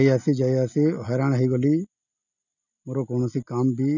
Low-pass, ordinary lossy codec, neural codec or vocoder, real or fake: 7.2 kHz; none; none; real